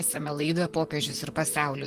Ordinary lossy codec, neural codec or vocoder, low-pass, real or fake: Opus, 24 kbps; vocoder, 44.1 kHz, 128 mel bands, Pupu-Vocoder; 14.4 kHz; fake